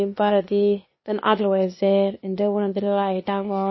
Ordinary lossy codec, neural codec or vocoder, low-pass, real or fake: MP3, 24 kbps; codec, 16 kHz, 0.8 kbps, ZipCodec; 7.2 kHz; fake